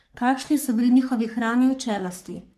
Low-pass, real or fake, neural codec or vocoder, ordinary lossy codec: 14.4 kHz; fake; codec, 44.1 kHz, 3.4 kbps, Pupu-Codec; MP3, 96 kbps